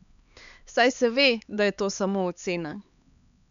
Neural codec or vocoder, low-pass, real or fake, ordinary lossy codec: codec, 16 kHz, 4 kbps, X-Codec, HuBERT features, trained on LibriSpeech; 7.2 kHz; fake; none